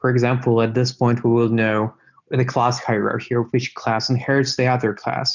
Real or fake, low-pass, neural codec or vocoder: real; 7.2 kHz; none